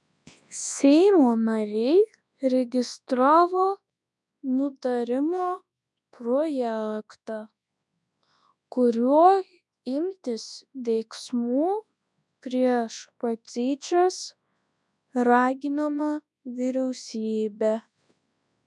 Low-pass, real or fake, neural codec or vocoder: 10.8 kHz; fake; codec, 24 kHz, 0.9 kbps, WavTokenizer, large speech release